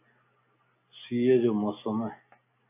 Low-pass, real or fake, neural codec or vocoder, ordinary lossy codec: 3.6 kHz; real; none; AAC, 24 kbps